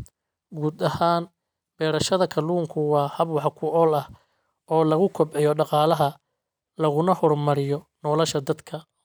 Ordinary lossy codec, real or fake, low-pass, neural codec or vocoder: none; real; none; none